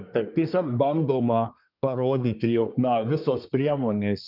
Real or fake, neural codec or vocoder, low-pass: fake; codec, 24 kHz, 1 kbps, SNAC; 5.4 kHz